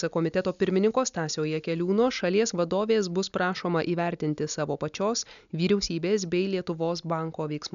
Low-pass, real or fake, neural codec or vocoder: 7.2 kHz; real; none